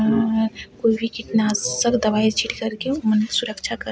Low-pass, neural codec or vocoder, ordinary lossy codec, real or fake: none; none; none; real